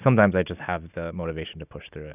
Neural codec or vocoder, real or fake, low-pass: none; real; 3.6 kHz